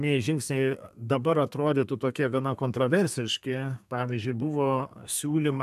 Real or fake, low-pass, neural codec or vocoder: fake; 14.4 kHz; codec, 32 kHz, 1.9 kbps, SNAC